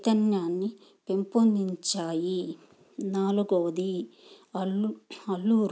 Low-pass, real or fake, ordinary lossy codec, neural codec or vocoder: none; real; none; none